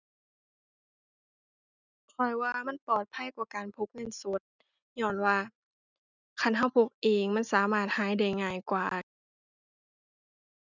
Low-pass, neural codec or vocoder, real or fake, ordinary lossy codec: 7.2 kHz; none; real; none